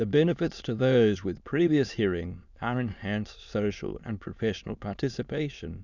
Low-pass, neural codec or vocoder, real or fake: 7.2 kHz; autoencoder, 22.05 kHz, a latent of 192 numbers a frame, VITS, trained on many speakers; fake